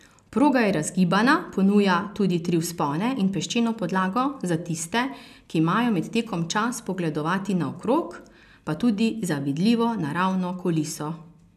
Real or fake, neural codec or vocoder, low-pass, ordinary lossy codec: real; none; 14.4 kHz; none